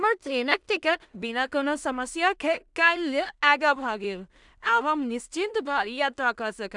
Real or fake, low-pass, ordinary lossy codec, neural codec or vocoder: fake; 10.8 kHz; none; codec, 16 kHz in and 24 kHz out, 0.4 kbps, LongCat-Audio-Codec, two codebook decoder